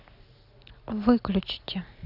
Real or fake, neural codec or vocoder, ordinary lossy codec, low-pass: real; none; none; 5.4 kHz